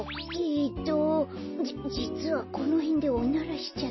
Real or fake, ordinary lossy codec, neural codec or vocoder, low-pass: real; MP3, 24 kbps; none; 7.2 kHz